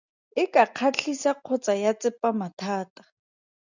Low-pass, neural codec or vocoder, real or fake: 7.2 kHz; none; real